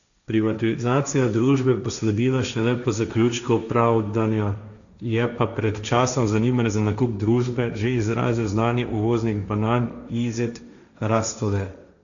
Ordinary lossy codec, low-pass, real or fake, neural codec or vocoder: none; 7.2 kHz; fake; codec, 16 kHz, 1.1 kbps, Voila-Tokenizer